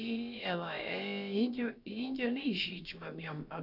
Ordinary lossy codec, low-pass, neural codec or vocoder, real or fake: none; 5.4 kHz; codec, 16 kHz, about 1 kbps, DyCAST, with the encoder's durations; fake